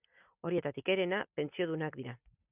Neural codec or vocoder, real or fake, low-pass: none; real; 3.6 kHz